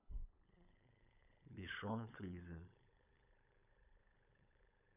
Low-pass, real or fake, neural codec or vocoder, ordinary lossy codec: 3.6 kHz; fake; codec, 16 kHz, 8 kbps, FunCodec, trained on LibriTTS, 25 frames a second; none